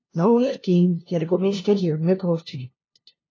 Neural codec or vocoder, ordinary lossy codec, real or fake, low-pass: codec, 16 kHz, 0.5 kbps, FunCodec, trained on LibriTTS, 25 frames a second; AAC, 32 kbps; fake; 7.2 kHz